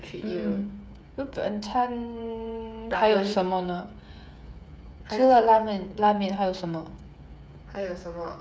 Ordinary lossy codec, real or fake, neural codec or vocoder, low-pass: none; fake; codec, 16 kHz, 8 kbps, FreqCodec, smaller model; none